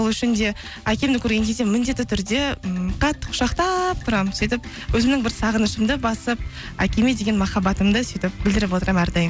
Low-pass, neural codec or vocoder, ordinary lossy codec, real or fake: none; none; none; real